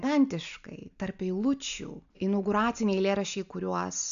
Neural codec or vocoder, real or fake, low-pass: none; real; 7.2 kHz